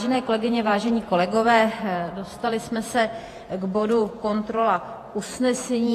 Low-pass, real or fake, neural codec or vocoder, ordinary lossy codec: 14.4 kHz; fake; vocoder, 48 kHz, 128 mel bands, Vocos; AAC, 48 kbps